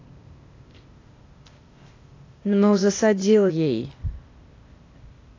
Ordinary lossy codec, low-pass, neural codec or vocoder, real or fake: AAC, 32 kbps; 7.2 kHz; codec, 16 kHz, 0.8 kbps, ZipCodec; fake